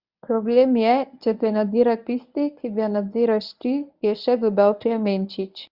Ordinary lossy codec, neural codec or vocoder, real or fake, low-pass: Opus, 64 kbps; codec, 24 kHz, 0.9 kbps, WavTokenizer, medium speech release version 1; fake; 5.4 kHz